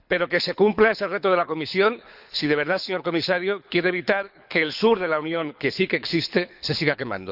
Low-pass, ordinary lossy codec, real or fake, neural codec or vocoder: 5.4 kHz; none; fake; codec, 24 kHz, 6 kbps, HILCodec